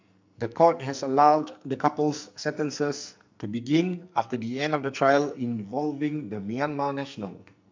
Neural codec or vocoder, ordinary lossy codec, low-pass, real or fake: codec, 44.1 kHz, 2.6 kbps, SNAC; MP3, 64 kbps; 7.2 kHz; fake